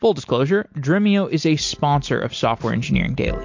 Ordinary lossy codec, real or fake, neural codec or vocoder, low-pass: MP3, 48 kbps; real; none; 7.2 kHz